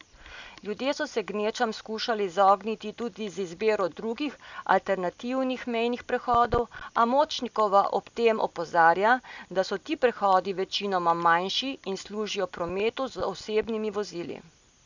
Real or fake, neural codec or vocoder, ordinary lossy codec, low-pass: real; none; none; 7.2 kHz